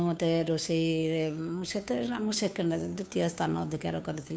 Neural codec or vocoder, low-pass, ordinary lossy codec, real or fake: codec, 16 kHz, 2 kbps, FunCodec, trained on Chinese and English, 25 frames a second; none; none; fake